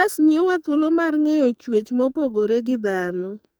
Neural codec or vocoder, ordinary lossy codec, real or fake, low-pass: codec, 44.1 kHz, 2.6 kbps, SNAC; none; fake; none